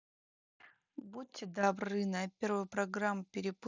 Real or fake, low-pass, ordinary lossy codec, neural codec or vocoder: real; 7.2 kHz; none; none